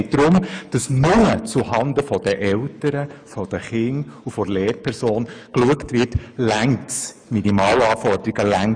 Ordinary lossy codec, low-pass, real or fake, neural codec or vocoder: none; 9.9 kHz; fake; autoencoder, 48 kHz, 128 numbers a frame, DAC-VAE, trained on Japanese speech